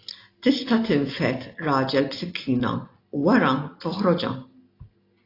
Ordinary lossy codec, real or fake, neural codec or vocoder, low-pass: AAC, 32 kbps; real; none; 5.4 kHz